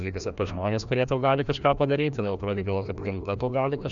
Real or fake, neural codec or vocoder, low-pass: fake; codec, 16 kHz, 1 kbps, FreqCodec, larger model; 7.2 kHz